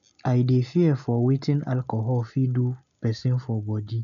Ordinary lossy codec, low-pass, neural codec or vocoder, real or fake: none; 7.2 kHz; none; real